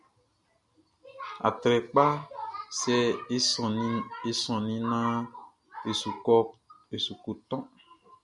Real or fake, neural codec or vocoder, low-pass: real; none; 10.8 kHz